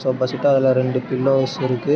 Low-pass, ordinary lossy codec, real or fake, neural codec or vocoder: none; none; real; none